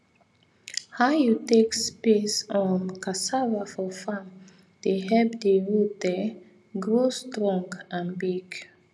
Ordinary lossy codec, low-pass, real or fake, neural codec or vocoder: none; none; real; none